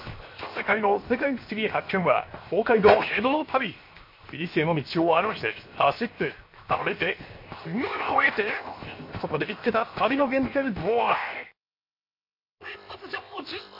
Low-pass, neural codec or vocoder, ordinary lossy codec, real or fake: 5.4 kHz; codec, 16 kHz, 0.7 kbps, FocalCodec; MP3, 32 kbps; fake